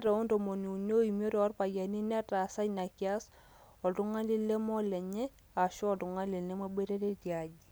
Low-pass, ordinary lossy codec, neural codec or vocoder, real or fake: none; none; none; real